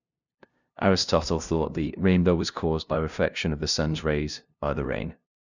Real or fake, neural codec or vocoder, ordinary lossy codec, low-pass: fake; codec, 16 kHz, 0.5 kbps, FunCodec, trained on LibriTTS, 25 frames a second; none; 7.2 kHz